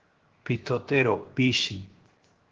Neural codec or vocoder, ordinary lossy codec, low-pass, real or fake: codec, 16 kHz, 0.7 kbps, FocalCodec; Opus, 16 kbps; 7.2 kHz; fake